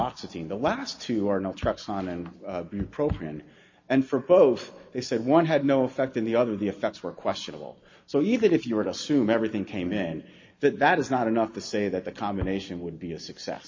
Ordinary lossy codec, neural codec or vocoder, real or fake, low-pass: MP3, 32 kbps; none; real; 7.2 kHz